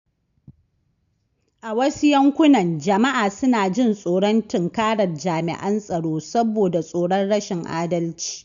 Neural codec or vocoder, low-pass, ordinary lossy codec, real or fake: none; 7.2 kHz; none; real